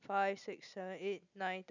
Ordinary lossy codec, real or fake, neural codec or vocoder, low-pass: none; real; none; 7.2 kHz